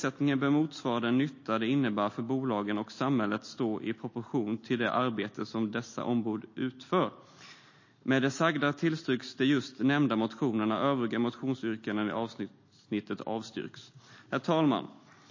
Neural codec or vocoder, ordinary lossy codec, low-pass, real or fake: none; MP3, 32 kbps; 7.2 kHz; real